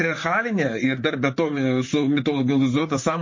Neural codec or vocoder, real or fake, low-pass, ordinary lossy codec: vocoder, 44.1 kHz, 128 mel bands, Pupu-Vocoder; fake; 7.2 kHz; MP3, 32 kbps